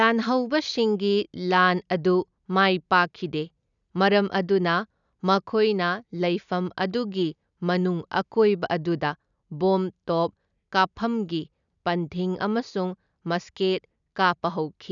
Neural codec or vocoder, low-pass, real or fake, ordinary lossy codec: none; 7.2 kHz; real; none